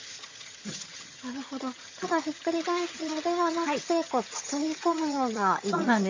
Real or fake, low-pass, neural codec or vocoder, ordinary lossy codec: fake; 7.2 kHz; vocoder, 22.05 kHz, 80 mel bands, HiFi-GAN; MP3, 48 kbps